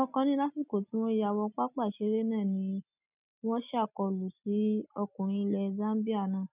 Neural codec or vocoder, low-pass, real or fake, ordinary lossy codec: none; 3.6 kHz; real; none